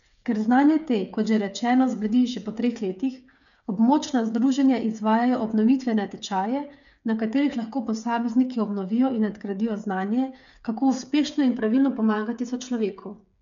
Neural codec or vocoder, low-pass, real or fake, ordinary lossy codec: codec, 16 kHz, 8 kbps, FreqCodec, smaller model; 7.2 kHz; fake; none